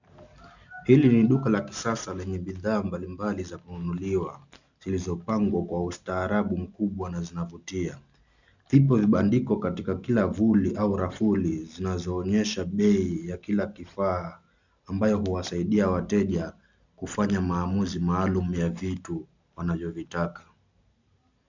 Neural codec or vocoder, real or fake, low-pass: none; real; 7.2 kHz